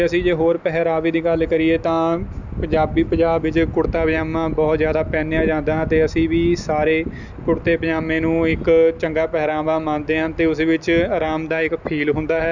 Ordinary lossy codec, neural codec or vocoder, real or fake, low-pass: none; none; real; 7.2 kHz